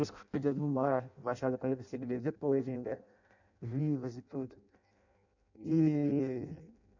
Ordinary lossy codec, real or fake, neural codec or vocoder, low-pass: none; fake; codec, 16 kHz in and 24 kHz out, 0.6 kbps, FireRedTTS-2 codec; 7.2 kHz